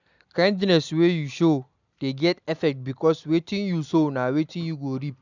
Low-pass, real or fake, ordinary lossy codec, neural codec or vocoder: 7.2 kHz; real; none; none